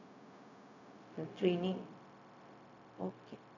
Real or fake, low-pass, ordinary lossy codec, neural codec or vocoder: fake; 7.2 kHz; none; codec, 16 kHz, 0.4 kbps, LongCat-Audio-Codec